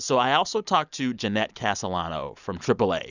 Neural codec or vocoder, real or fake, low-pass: none; real; 7.2 kHz